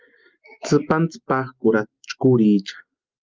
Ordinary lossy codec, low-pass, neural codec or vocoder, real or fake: Opus, 32 kbps; 7.2 kHz; none; real